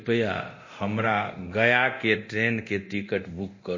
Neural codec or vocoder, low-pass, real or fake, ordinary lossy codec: codec, 24 kHz, 0.9 kbps, DualCodec; 7.2 kHz; fake; MP3, 32 kbps